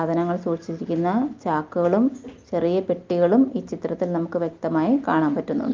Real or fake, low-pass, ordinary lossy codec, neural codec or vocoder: real; 7.2 kHz; Opus, 32 kbps; none